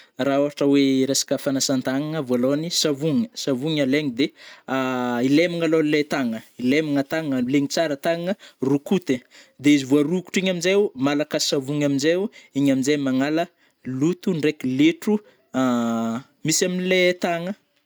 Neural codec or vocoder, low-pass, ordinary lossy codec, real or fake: none; none; none; real